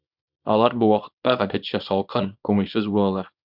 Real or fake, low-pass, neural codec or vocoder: fake; 5.4 kHz; codec, 24 kHz, 0.9 kbps, WavTokenizer, small release